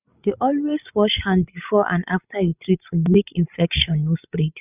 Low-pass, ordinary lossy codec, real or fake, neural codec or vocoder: 3.6 kHz; none; fake; codec, 16 kHz, 16 kbps, FreqCodec, larger model